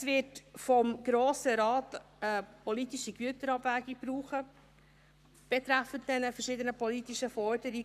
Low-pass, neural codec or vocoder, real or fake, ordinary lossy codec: 14.4 kHz; codec, 44.1 kHz, 7.8 kbps, Pupu-Codec; fake; none